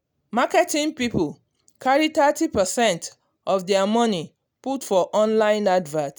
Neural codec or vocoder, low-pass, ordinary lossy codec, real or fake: none; none; none; real